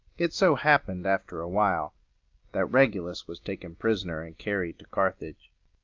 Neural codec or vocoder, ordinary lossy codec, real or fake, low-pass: none; Opus, 24 kbps; real; 7.2 kHz